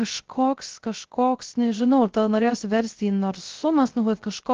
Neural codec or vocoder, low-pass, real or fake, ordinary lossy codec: codec, 16 kHz, 0.3 kbps, FocalCodec; 7.2 kHz; fake; Opus, 16 kbps